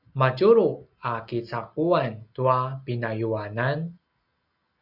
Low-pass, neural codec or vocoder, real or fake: 5.4 kHz; none; real